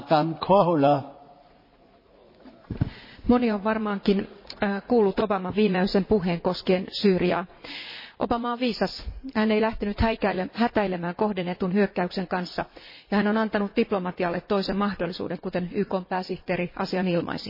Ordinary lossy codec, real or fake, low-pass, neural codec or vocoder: MP3, 24 kbps; fake; 5.4 kHz; vocoder, 44.1 kHz, 80 mel bands, Vocos